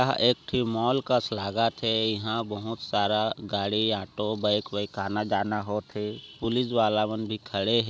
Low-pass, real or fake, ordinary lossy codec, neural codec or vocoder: none; real; none; none